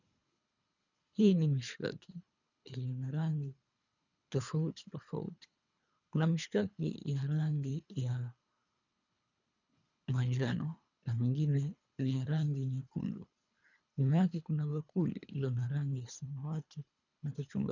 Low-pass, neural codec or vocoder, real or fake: 7.2 kHz; codec, 24 kHz, 3 kbps, HILCodec; fake